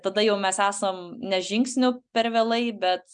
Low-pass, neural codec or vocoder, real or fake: 9.9 kHz; none; real